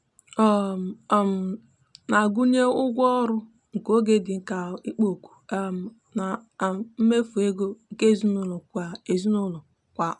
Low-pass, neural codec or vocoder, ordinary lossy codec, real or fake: 10.8 kHz; none; none; real